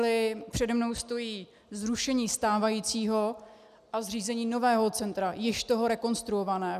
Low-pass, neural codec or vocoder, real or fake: 14.4 kHz; none; real